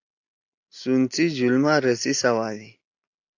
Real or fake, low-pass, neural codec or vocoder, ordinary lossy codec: real; 7.2 kHz; none; AAC, 48 kbps